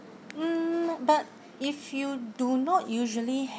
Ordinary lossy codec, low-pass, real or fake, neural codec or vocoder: none; none; real; none